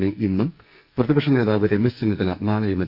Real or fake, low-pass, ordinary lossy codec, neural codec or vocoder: fake; 5.4 kHz; none; codec, 44.1 kHz, 2.6 kbps, SNAC